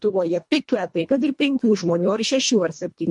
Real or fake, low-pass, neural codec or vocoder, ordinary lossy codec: fake; 10.8 kHz; codec, 24 kHz, 1.5 kbps, HILCodec; MP3, 48 kbps